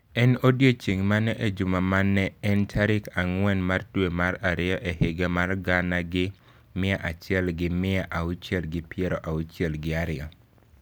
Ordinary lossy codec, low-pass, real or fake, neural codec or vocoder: none; none; real; none